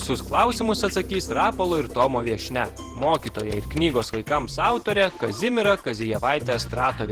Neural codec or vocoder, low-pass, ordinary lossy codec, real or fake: vocoder, 48 kHz, 128 mel bands, Vocos; 14.4 kHz; Opus, 16 kbps; fake